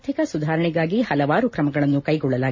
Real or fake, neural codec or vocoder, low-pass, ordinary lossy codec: real; none; 7.2 kHz; MP3, 32 kbps